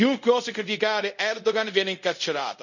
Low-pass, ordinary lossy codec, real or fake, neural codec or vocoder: 7.2 kHz; none; fake; codec, 24 kHz, 0.5 kbps, DualCodec